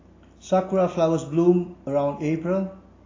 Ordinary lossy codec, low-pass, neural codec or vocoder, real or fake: AAC, 32 kbps; 7.2 kHz; none; real